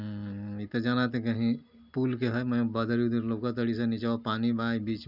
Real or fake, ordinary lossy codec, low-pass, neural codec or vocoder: real; none; 5.4 kHz; none